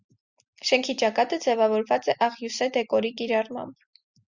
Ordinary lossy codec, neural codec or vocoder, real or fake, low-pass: Opus, 64 kbps; none; real; 7.2 kHz